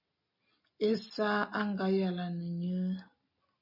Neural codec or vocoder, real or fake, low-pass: none; real; 5.4 kHz